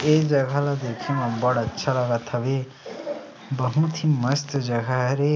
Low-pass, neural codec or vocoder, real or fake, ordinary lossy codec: none; none; real; none